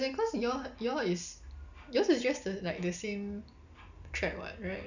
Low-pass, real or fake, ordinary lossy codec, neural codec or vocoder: 7.2 kHz; real; none; none